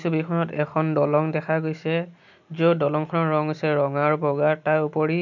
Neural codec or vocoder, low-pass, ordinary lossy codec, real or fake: none; 7.2 kHz; none; real